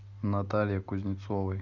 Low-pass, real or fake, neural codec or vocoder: 7.2 kHz; real; none